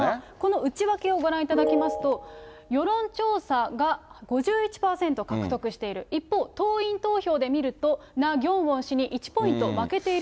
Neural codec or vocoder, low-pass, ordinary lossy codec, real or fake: none; none; none; real